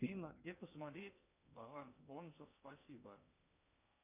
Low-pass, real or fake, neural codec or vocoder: 3.6 kHz; fake; codec, 16 kHz in and 24 kHz out, 0.8 kbps, FocalCodec, streaming, 65536 codes